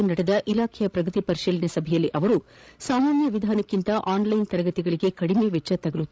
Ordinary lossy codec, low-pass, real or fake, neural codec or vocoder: none; none; fake; codec, 16 kHz, 16 kbps, FreqCodec, larger model